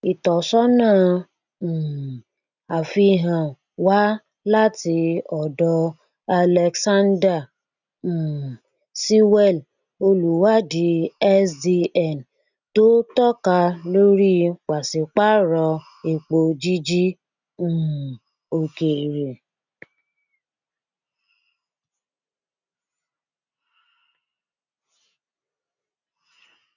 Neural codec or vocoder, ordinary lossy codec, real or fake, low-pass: none; none; real; 7.2 kHz